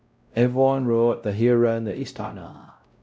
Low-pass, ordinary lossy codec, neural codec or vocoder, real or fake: none; none; codec, 16 kHz, 0.5 kbps, X-Codec, WavLM features, trained on Multilingual LibriSpeech; fake